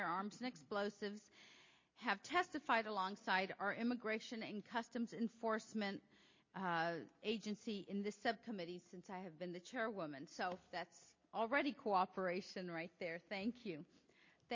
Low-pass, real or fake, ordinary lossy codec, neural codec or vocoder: 7.2 kHz; real; MP3, 32 kbps; none